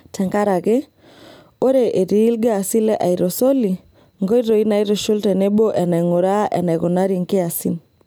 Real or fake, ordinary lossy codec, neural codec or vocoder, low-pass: real; none; none; none